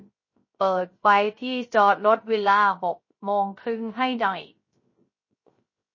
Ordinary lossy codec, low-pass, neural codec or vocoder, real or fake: MP3, 32 kbps; 7.2 kHz; codec, 16 kHz, 0.3 kbps, FocalCodec; fake